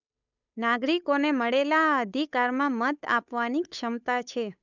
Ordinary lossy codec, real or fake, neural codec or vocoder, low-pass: none; fake; codec, 16 kHz, 8 kbps, FunCodec, trained on Chinese and English, 25 frames a second; 7.2 kHz